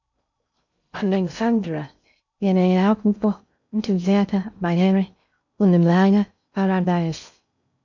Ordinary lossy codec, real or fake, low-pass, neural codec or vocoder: none; fake; 7.2 kHz; codec, 16 kHz in and 24 kHz out, 0.6 kbps, FocalCodec, streaming, 2048 codes